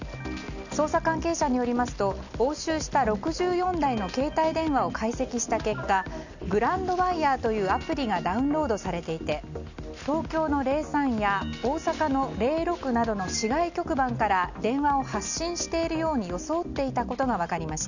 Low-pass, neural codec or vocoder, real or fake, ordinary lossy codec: 7.2 kHz; none; real; none